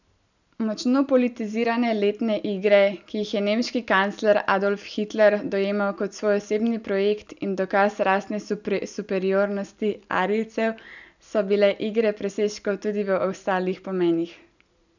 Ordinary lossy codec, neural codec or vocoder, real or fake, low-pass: none; none; real; 7.2 kHz